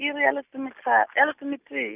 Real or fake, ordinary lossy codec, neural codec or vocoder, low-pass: real; none; none; 3.6 kHz